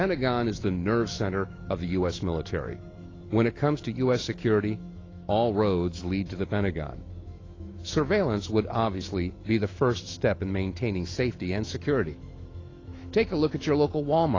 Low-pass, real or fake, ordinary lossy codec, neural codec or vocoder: 7.2 kHz; fake; AAC, 32 kbps; autoencoder, 48 kHz, 128 numbers a frame, DAC-VAE, trained on Japanese speech